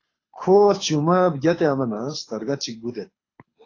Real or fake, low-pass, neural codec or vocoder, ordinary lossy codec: fake; 7.2 kHz; codec, 24 kHz, 6 kbps, HILCodec; AAC, 32 kbps